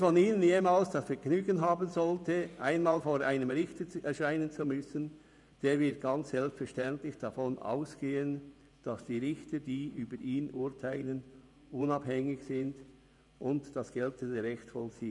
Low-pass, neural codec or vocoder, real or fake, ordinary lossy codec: 10.8 kHz; vocoder, 24 kHz, 100 mel bands, Vocos; fake; none